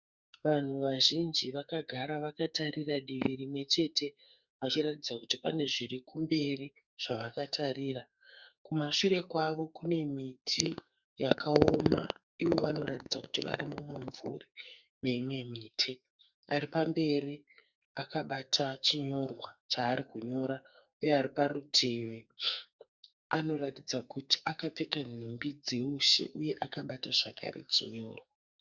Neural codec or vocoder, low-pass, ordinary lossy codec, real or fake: codec, 44.1 kHz, 2.6 kbps, SNAC; 7.2 kHz; Opus, 64 kbps; fake